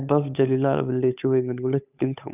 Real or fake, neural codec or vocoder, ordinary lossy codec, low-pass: fake; codec, 16 kHz, 8 kbps, FunCodec, trained on Chinese and English, 25 frames a second; none; 3.6 kHz